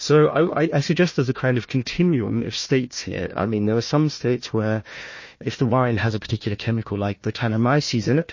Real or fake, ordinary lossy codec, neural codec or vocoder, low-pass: fake; MP3, 32 kbps; codec, 16 kHz, 1 kbps, FunCodec, trained on Chinese and English, 50 frames a second; 7.2 kHz